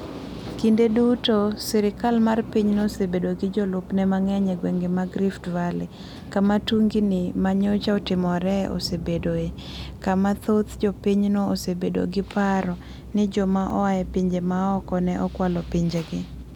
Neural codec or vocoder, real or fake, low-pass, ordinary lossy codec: none; real; 19.8 kHz; none